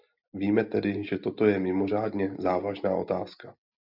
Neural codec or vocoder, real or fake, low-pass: none; real; 5.4 kHz